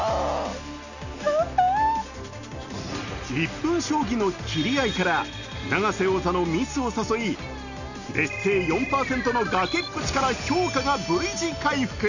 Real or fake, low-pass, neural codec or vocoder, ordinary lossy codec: real; 7.2 kHz; none; none